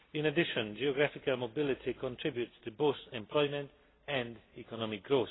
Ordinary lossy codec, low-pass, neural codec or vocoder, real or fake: AAC, 16 kbps; 7.2 kHz; none; real